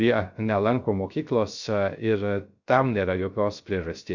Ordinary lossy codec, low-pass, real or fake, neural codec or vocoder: Opus, 64 kbps; 7.2 kHz; fake; codec, 16 kHz, 0.3 kbps, FocalCodec